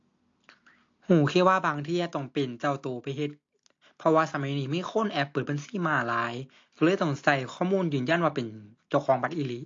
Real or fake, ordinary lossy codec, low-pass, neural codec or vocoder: real; AAC, 48 kbps; 7.2 kHz; none